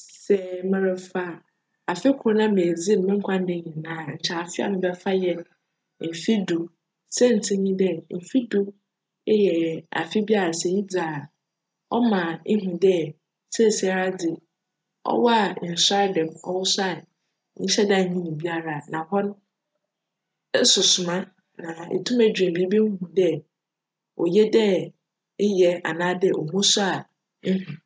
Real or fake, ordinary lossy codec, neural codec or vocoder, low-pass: real; none; none; none